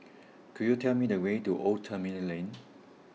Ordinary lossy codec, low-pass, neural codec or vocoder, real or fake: none; none; none; real